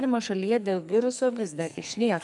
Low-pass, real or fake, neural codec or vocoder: 10.8 kHz; fake; codec, 44.1 kHz, 2.6 kbps, SNAC